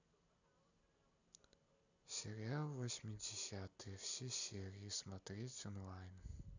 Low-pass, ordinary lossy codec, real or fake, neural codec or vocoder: 7.2 kHz; none; real; none